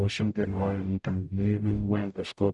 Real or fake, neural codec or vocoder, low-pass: fake; codec, 44.1 kHz, 0.9 kbps, DAC; 10.8 kHz